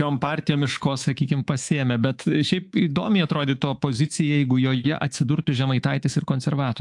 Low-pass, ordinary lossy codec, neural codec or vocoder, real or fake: 10.8 kHz; AAC, 64 kbps; codec, 24 kHz, 3.1 kbps, DualCodec; fake